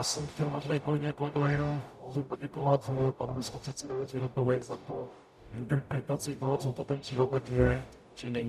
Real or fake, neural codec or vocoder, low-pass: fake; codec, 44.1 kHz, 0.9 kbps, DAC; 14.4 kHz